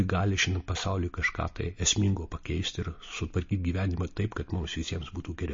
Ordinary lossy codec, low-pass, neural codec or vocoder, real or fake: MP3, 32 kbps; 7.2 kHz; none; real